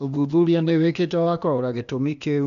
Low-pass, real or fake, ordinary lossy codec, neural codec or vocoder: 7.2 kHz; fake; none; codec, 16 kHz, 0.8 kbps, ZipCodec